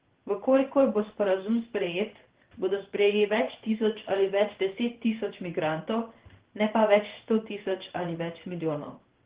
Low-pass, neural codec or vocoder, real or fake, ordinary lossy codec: 3.6 kHz; codec, 16 kHz in and 24 kHz out, 1 kbps, XY-Tokenizer; fake; Opus, 16 kbps